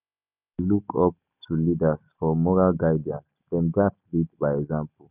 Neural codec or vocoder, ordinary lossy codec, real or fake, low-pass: none; none; real; 3.6 kHz